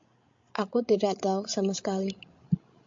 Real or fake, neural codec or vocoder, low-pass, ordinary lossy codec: fake; codec, 16 kHz, 16 kbps, FreqCodec, larger model; 7.2 kHz; MP3, 48 kbps